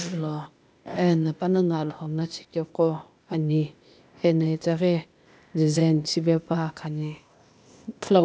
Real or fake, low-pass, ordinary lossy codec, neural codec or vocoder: fake; none; none; codec, 16 kHz, 0.8 kbps, ZipCodec